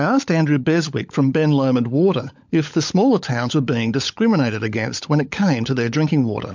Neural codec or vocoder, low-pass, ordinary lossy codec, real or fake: codec, 16 kHz, 16 kbps, FunCodec, trained on LibriTTS, 50 frames a second; 7.2 kHz; MP3, 64 kbps; fake